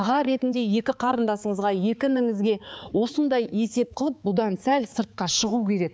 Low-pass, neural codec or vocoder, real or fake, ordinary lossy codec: none; codec, 16 kHz, 4 kbps, X-Codec, HuBERT features, trained on balanced general audio; fake; none